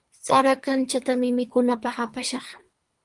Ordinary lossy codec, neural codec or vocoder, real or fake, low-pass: Opus, 32 kbps; codec, 24 kHz, 3 kbps, HILCodec; fake; 10.8 kHz